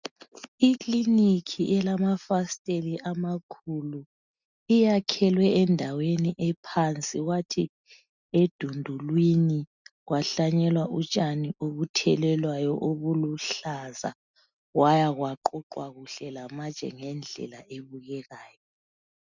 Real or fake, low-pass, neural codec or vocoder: real; 7.2 kHz; none